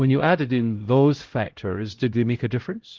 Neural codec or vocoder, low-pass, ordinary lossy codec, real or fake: codec, 16 kHz, 0.5 kbps, X-Codec, HuBERT features, trained on LibriSpeech; 7.2 kHz; Opus, 16 kbps; fake